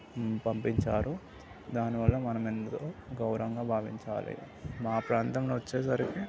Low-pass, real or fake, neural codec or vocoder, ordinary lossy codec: none; real; none; none